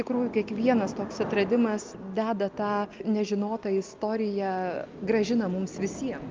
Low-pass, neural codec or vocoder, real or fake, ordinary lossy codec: 7.2 kHz; none; real; Opus, 24 kbps